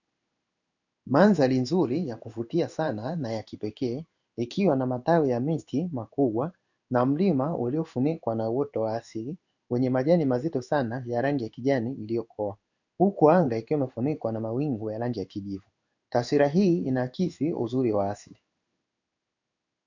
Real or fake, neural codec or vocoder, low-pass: fake; codec, 16 kHz in and 24 kHz out, 1 kbps, XY-Tokenizer; 7.2 kHz